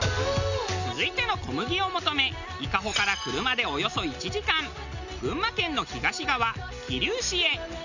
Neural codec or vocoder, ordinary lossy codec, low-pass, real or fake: none; none; 7.2 kHz; real